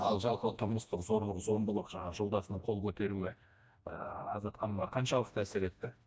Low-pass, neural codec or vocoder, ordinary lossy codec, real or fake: none; codec, 16 kHz, 1 kbps, FreqCodec, smaller model; none; fake